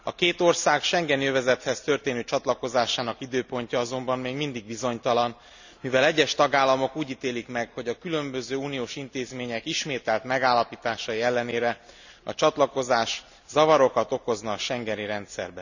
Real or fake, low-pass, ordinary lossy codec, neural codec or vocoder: real; 7.2 kHz; none; none